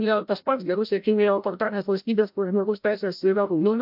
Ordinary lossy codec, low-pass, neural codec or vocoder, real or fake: MP3, 48 kbps; 5.4 kHz; codec, 16 kHz, 0.5 kbps, FreqCodec, larger model; fake